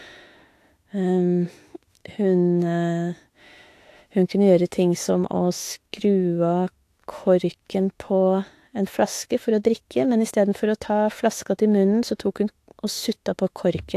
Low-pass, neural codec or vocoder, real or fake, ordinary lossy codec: 14.4 kHz; autoencoder, 48 kHz, 32 numbers a frame, DAC-VAE, trained on Japanese speech; fake; AAC, 64 kbps